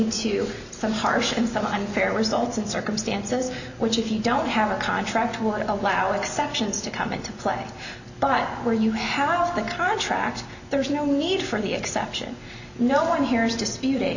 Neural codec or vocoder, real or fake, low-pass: none; real; 7.2 kHz